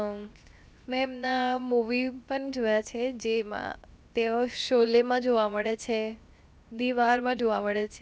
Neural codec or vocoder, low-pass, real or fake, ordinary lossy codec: codec, 16 kHz, 0.7 kbps, FocalCodec; none; fake; none